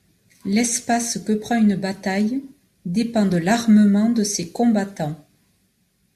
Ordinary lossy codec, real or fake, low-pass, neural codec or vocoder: MP3, 64 kbps; real; 14.4 kHz; none